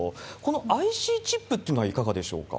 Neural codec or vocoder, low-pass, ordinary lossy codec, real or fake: none; none; none; real